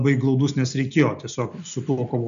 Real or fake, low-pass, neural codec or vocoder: real; 7.2 kHz; none